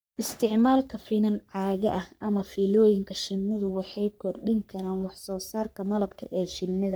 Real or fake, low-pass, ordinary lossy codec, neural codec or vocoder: fake; none; none; codec, 44.1 kHz, 3.4 kbps, Pupu-Codec